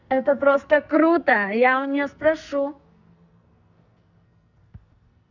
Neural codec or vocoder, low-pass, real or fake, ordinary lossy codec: codec, 44.1 kHz, 2.6 kbps, SNAC; 7.2 kHz; fake; none